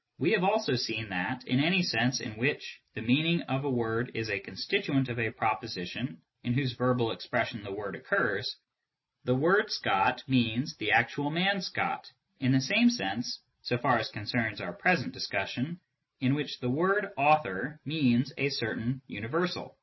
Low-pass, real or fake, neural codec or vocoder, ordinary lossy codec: 7.2 kHz; real; none; MP3, 24 kbps